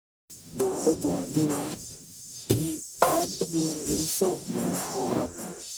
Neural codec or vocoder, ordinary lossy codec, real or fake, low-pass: codec, 44.1 kHz, 0.9 kbps, DAC; none; fake; none